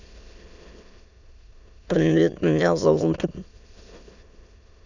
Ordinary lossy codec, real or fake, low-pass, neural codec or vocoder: none; fake; 7.2 kHz; autoencoder, 22.05 kHz, a latent of 192 numbers a frame, VITS, trained on many speakers